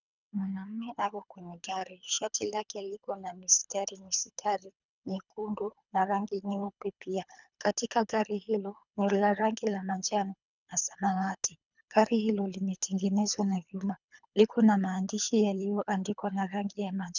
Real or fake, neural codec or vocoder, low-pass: fake; codec, 24 kHz, 3 kbps, HILCodec; 7.2 kHz